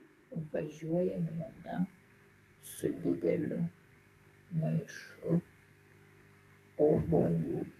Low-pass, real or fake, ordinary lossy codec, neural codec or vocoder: 14.4 kHz; fake; AAC, 64 kbps; codec, 44.1 kHz, 2.6 kbps, SNAC